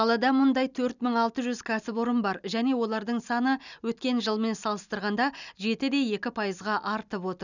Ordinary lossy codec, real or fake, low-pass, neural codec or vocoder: none; real; 7.2 kHz; none